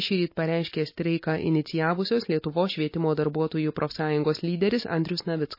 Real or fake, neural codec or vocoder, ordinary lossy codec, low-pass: real; none; MP3, 24 kbps; 5.4 kHz